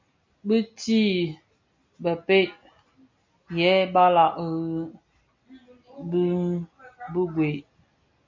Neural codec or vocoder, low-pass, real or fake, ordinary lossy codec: none; 7.2 kHz; real; MP3, 64 kbps